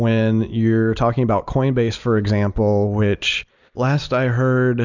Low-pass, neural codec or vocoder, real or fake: 7.2 kHz; none; real